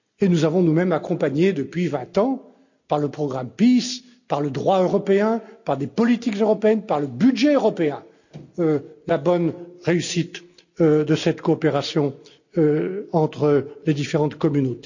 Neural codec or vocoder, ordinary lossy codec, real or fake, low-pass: none; none; real; 7.2 kHz